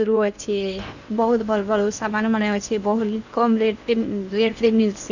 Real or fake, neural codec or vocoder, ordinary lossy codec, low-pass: fake; codec, 16 kHz in and 24 kHz out, 0.8 kbps, FocalCodec, streaming, 65536 codes; none; 7.2 kHz